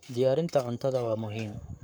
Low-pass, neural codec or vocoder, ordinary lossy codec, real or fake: none; codec, 44.1 kHz, 7.8 kbps, Pupu-Codec; none; fake